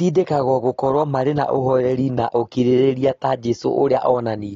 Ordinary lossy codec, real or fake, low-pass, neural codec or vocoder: AAC, 32 kbps; real; 7.2 kHz; none